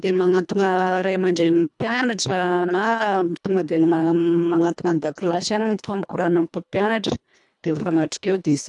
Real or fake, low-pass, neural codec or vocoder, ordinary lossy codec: fake; 10.8 kHz; codec, 24 kHz, 1.5 kbps, HILCodec; none